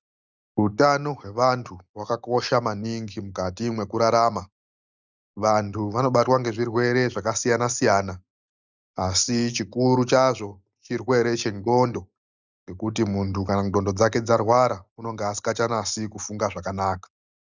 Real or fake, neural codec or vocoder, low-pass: real; none; 7.2 kHz